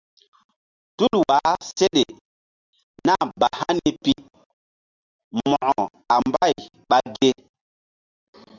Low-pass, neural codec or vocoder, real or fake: 7.2 kHz; none; real